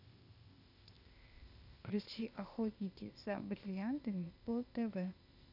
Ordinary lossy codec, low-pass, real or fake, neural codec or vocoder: none; 5.4 kHz; fake; codec, 16 kHz, 0.8 kbps, ZipCodec